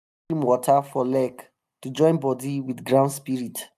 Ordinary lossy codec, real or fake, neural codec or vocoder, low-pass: none; real; none; 14.4 kHz